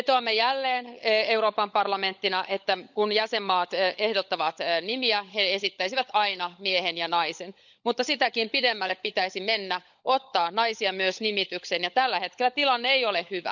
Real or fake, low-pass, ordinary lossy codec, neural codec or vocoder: fake; 7.2 kHz; none; codec, 16 kHz, 16 kbps, FunCodec, trained on LibriTTS, 50 frames a second